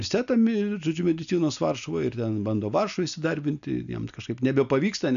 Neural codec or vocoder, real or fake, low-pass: none; real; 7.2 kHz